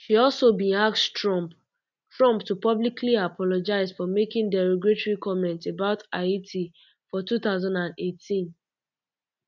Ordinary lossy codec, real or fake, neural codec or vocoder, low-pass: none; real; none; 7.2 kHz